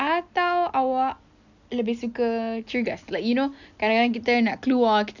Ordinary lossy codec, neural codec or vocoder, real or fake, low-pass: none; none; real; 7.2 kHz